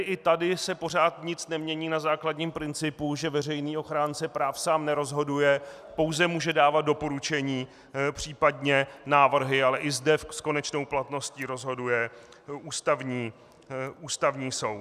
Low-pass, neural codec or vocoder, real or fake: 14.4 kHz; none; real